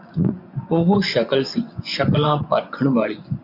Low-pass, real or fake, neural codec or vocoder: 5.4 kHz; fake; vocoder, 24 kHz, 100 mel bands, Vocos